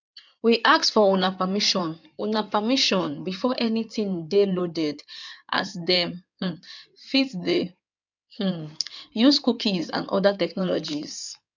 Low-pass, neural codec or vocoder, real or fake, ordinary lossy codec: 7.2 kHz; codec, 16 kHz in and 24 kHz out, 2.2 kbps, FireRedTTS-2 codec; fake; none